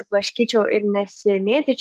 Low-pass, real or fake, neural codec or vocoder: 14.4 kHz; fake; codec, 44.1 kHz, 7.8 kbps, DAC